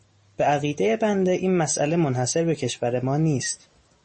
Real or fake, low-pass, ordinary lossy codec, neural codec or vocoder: real; 10.8 kHz; MP3, 32 kbps; none